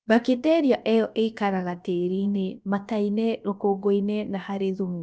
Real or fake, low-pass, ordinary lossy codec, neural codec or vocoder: fake; none; none; codec, 16 kHz, about 1 kbps, DyCAST, with the encoder's durations